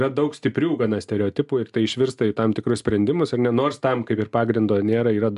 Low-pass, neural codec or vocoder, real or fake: 10.8 kHz; none; real